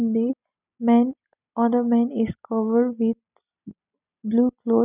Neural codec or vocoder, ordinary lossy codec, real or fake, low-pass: none; none; real; 3.6 kHz